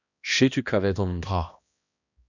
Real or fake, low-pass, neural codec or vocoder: fake; 7.2 kHz; codec, 16 kHz, 1 kbps, X-Codec, HuBERT features, trained on balanced general audio